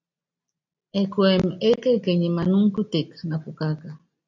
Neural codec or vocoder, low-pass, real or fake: none; 7.2 kHz; real